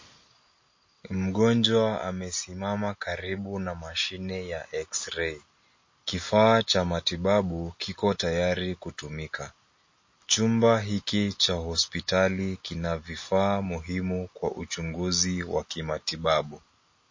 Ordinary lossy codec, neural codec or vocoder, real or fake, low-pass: MP3, 32 kbps; none; real; 7.2 kHz